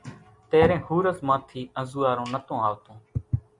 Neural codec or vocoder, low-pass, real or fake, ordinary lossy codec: none; 10.8 kHz; real; MP3, 96 kbps